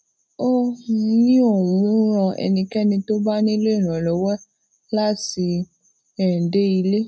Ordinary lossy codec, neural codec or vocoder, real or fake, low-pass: none; none; real; none